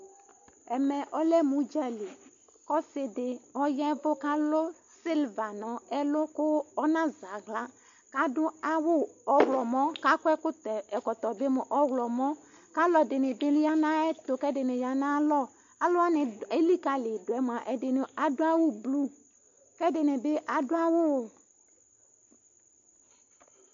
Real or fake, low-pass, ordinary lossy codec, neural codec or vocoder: real; 7.2 kHz; MP3, 48 kbps; none